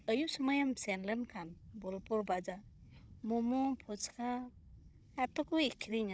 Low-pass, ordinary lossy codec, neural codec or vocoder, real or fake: none; none; codec, 16 kHz, 16 kbps, FunCodec, trained on Chinese and English, 50 frames a second; fake